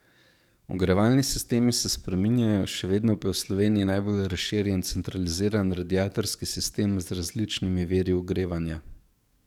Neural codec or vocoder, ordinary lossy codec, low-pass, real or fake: codec, 44.1 kHz, 7.8 kbps, DAC; none; 19.8 kHz; fake